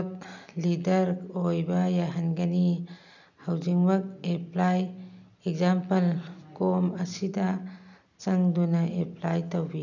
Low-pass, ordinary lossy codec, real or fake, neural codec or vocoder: 7.2 kHz; none; real; none